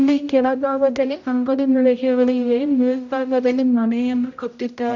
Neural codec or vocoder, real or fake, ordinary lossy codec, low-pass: codec, 16 kHz, 0.5 kbps, X-Codec, HuBERT features, trained on general audio; fake; none; 7.2 kHz